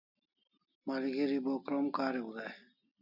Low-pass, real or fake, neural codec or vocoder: 5.4 kHz; real; none